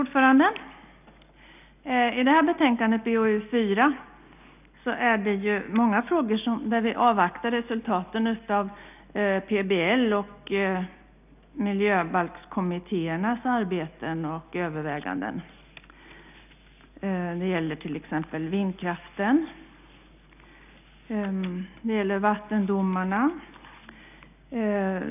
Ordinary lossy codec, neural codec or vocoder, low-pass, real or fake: none; none; 3.6 kHz; real